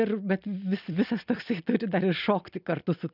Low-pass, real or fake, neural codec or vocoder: 5.4 kHz; real; none